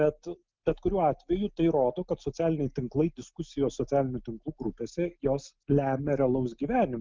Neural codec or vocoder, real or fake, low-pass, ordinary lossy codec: none; real; 7.2 kHz; Opus, 24 kbps